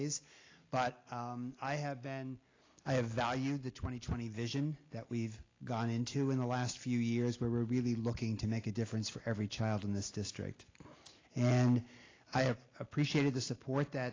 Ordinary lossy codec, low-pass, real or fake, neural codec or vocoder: AAC, 32 kbps; 7.2 kHz; real; none